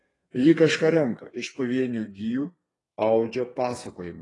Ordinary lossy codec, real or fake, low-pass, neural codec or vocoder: AAC, 32 kbps; fake; 10.8 kHz; codec, 32 kHz, 1.9 kbps, SNAC